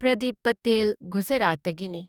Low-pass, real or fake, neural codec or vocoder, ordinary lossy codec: 19.8 kHz; fake; codec, 44.1 kHz, 2.6 kbps, DAC; none